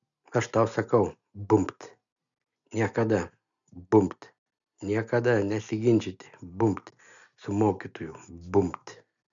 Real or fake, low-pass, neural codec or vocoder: real; 7.2 kHz; none